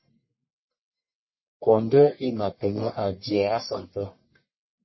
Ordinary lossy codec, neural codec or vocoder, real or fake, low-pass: MP3, 24 kbps; codec, 44.1 kHz, 1.7 kbps, Pupu-Codec; fake; 7.2 kHz